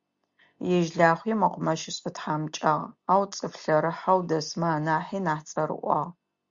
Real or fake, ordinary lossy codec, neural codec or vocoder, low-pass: real; Opus, 64 kbps; none; 7.2 kHz